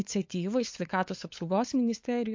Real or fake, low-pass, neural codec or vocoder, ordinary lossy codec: fake; 7.2 kHz; codec, 16 kHz, 8 kbps, FunCodec, trained on LibriTTS, 25 frames a second; MP3, 48 kbps